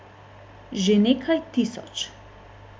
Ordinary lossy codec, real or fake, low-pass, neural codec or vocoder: none; real; none; none